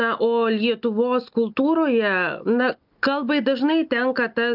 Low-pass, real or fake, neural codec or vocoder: 5.4 kHz; real; none